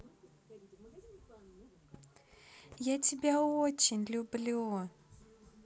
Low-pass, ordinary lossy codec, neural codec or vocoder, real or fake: none; none; none; real